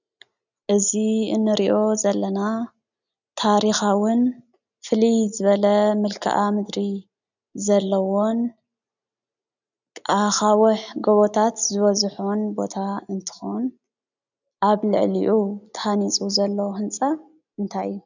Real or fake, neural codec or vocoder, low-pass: real; none; 7.2 kHz